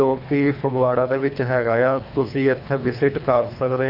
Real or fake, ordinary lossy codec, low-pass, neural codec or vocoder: fake; none; 5.4 kHz; codec, 24 kHz, 3 kbps, HILCodec